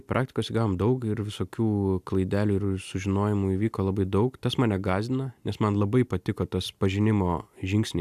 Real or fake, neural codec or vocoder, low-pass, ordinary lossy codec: real; none; 14.4 kHz; AAC, 96 kbps